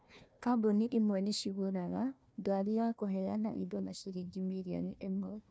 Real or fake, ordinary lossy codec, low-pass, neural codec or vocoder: fake; none; none; codec, 16 kHz, 1 kbps, FunCodec, trained on Chinese and English, 50 frames a second